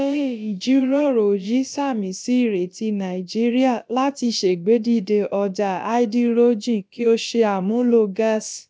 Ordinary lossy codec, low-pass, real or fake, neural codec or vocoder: none; none; fake; codec, 16 kHz, about 1 kbps, DyCAST, with the encoder's durations